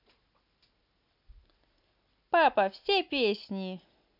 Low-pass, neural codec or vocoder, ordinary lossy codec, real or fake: 5.4 kHz; none; none; real